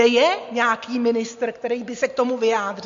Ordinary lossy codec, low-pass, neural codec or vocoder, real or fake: MP3, 48 kbps; 7.2 kHz; none; real